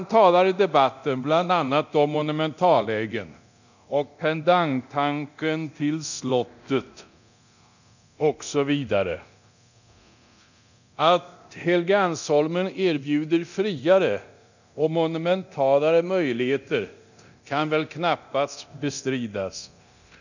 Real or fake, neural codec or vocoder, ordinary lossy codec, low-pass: fake; codec, 24 kHz, 0.9 kbps, DualCodec; none; 7.2 kHz